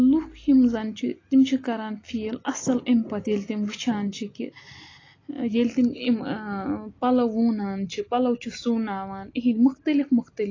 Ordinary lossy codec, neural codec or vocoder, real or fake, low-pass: AAC, 32 kbps; none; real; 7.2 kHz